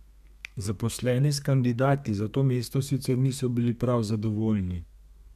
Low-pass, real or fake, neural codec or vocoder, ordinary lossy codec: 14.4 kHz; fake; codec, 32 kHz, 1.9 kbps, SNAC; none